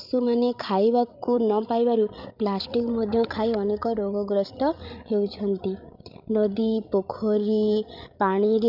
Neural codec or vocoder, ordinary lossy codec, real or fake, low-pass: codec, 16 kHz, 16 kbps, FreqCodec, larger model; none; fake; 5.4 kHz